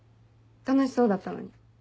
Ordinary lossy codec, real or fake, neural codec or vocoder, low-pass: none; real; none; none